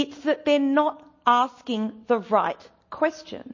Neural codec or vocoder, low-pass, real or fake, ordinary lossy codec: none; 7.2 kHz; real; MP3, 32 kbps